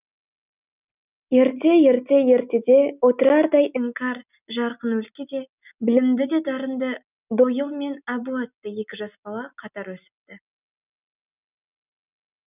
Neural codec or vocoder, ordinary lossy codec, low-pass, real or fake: none; none; 3.6 kHz; real